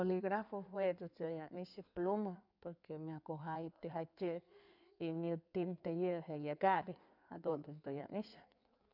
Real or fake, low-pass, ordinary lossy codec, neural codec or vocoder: fake; 5.4 kHz; none; codec, 16 kHz in and 24 kHz out, 2.2 kbps, FireRedTTS-2 codec